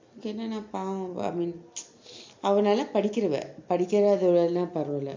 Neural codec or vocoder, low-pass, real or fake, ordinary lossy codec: none; 7.2 kHz; real; MP3, 48 kbps